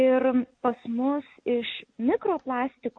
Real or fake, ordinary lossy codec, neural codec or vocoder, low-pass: real; MP3, 48 kbps; none; 9.9 kHz